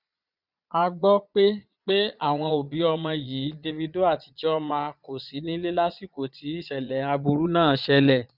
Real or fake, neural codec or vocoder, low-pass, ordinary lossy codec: fake; vocoder, 22.05 kHz, 80 mel bands, Vocos; 5.4 kHz; none